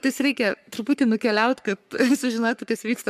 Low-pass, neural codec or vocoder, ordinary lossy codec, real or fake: 14.4 kHz; codec, 44.1 kHz, 3.4 kbps, Pupu-Codec; MP3, 96 kbps; fake